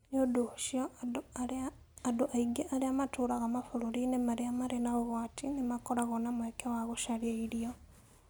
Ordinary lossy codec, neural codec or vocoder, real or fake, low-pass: none; none; real; none